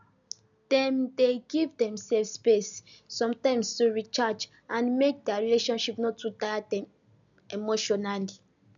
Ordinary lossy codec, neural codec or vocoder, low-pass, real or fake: none; none; 7.2 kHz; real